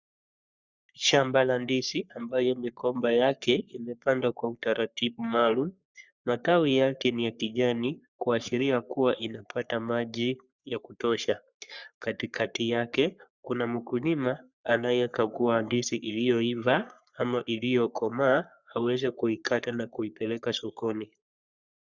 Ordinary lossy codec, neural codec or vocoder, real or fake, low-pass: Opus, 64 kbps; codec, 44.1 kHz, 3.4 kbps, Pupu-Codec; fake; 7.2 kHz